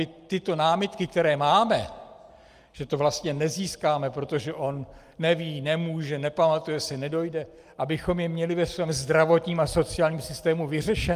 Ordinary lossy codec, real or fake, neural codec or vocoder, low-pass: Opus, 32 kbps; real; none; 14.4 kHz